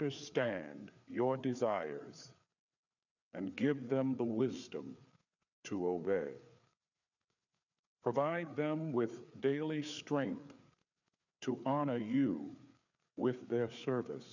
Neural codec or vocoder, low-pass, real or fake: codec, 16 kHz, 4 kbps, FreqCodec, larger model; 7.2 kHz; fake